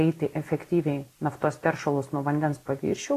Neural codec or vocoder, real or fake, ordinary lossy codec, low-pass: none; real; AAC, 48 kbps; 14.4 kHz